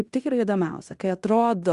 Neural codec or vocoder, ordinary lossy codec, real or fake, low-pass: codec, 16 kHz in and 24 kHz out, 0.9 kbps, LongCat-Audio-Codec, fine tuned four codebook decoder; Opus, 64 kbps; fake; 10.8 kHz